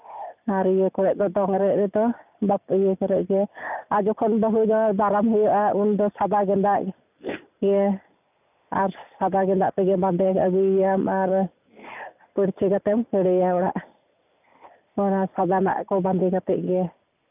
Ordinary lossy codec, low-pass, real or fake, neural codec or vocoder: none; 3.6 kHz; real; none